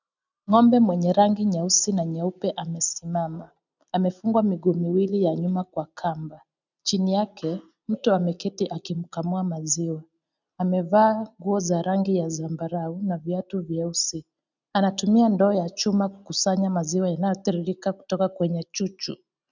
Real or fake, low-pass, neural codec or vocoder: real; 7.2 kHz; none